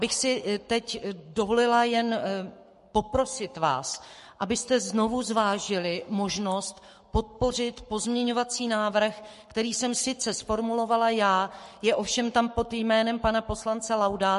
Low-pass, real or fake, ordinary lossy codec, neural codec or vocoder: 14.4 kHz; fake; MP3, 48 kbps; codec, 44.1 kHz, 7.8 kbps, Pupu-Codec